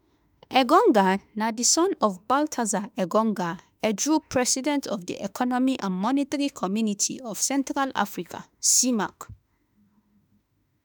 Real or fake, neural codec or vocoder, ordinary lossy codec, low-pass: fake; autoencoder, 48 kHz, 32 numbers a frame, DAC-VAE, trained on Japanese speech; none; none